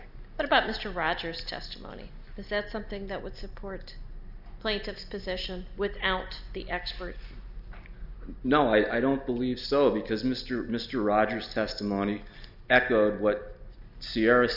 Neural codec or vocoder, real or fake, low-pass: none; real; 5.4 kHz